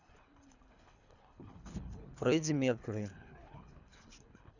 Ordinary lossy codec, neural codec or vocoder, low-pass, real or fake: none; codec, 24 kHz, 3 kbps, HILCodec; 7.2 kHz; fake